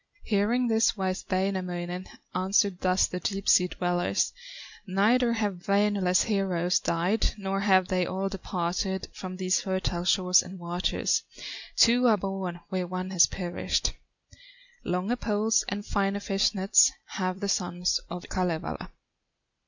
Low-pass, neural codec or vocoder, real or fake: 7.2 kHz; none; real